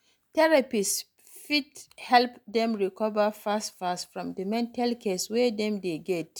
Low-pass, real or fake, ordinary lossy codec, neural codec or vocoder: none; real; none; none